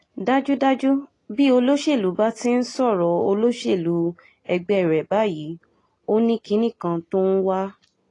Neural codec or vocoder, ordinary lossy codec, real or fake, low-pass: none; AAC, 32 kbps; real; 10.8 kHz